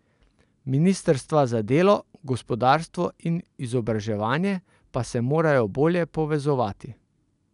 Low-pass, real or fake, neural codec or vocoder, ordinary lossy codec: 10.8 kHz; real; none; none